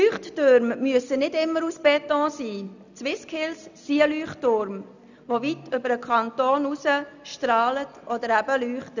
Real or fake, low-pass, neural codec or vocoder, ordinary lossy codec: real; 7.2 kHz; none; none